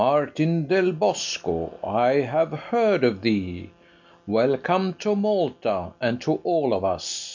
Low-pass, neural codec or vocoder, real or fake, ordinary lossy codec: 7.2 kHz; none; real; MP3, 64 kbps